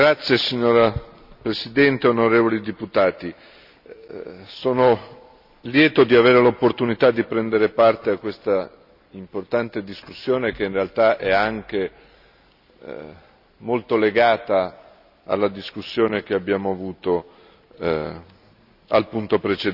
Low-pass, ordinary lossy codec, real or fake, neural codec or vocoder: 5.4 kHz; none; real; none